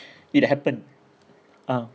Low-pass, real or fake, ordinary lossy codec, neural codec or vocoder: none; real; none; none